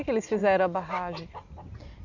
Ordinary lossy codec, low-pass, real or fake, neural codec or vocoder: none; 7.2 kHz; real; none